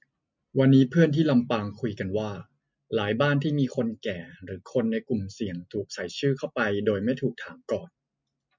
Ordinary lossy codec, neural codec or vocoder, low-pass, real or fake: MP3, 64 kbps; none; 7.2 kHz; real